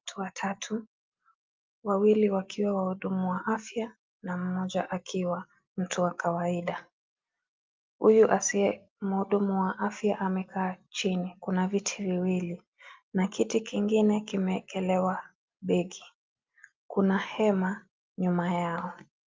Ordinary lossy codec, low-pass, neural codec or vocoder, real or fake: Opus, 24 kbps; 7.2 kHz; none; real